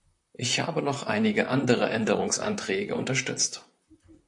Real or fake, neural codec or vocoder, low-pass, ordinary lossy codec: fake; vocoder, 44.1 kHz, 128 mel bands, Pupu-Vocoder; 10.8 kHz; AAC, 64 kbps